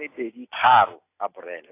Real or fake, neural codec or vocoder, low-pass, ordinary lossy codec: real; none; 3.6 kHz; AAC, 24 kbps